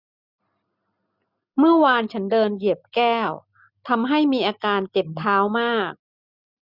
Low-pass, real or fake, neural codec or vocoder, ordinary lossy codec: 5.4 kHz; real; none; none